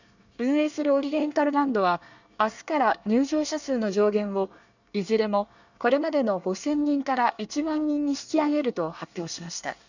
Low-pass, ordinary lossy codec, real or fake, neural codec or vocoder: 7.2 kHz; none; fake; codec, 24 kHz, 1 kbps, SNAC